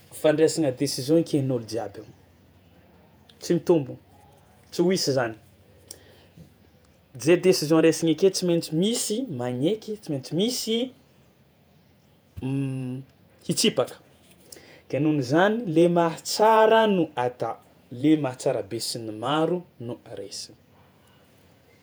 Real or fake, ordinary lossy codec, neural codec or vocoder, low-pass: fake; none; vocoder, 48 kHz, 128 mel bands, Vocos; none